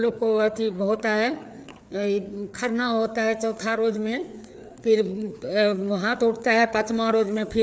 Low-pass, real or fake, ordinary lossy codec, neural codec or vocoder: none; fake; none; codec, 16 kHz, 4 kbps, FreqCodec, larger model